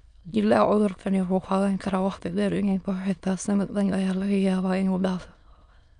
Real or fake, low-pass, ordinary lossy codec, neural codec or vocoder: fake; 9.9 kHz; none; autoencoder, 22.05 kHz, a latent of 192 numbers a frame, VITS, trained on many speakers